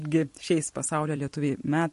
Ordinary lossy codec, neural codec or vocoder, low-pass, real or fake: MP3, 48 kbps; vocoder, 44.1 kHz, 128 mel bands every 256 samples, BigVGAN v2; 14.4 kHz; fake